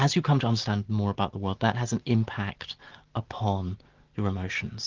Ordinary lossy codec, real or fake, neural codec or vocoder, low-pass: Opus, 16 kbps; real; none; 7.2 kHz